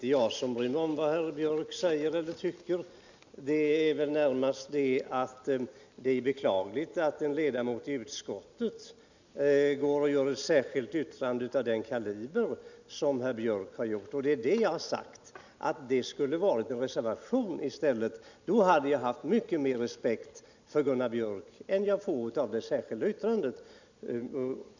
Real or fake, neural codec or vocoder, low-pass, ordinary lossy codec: real; none; 7.2 kHz; none